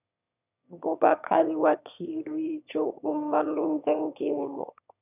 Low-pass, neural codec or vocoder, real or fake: 3.6 kHz; autoencoder, 22.05 kHz, a latent of 192 numbers a frame, VITS, trained on one speaker; fake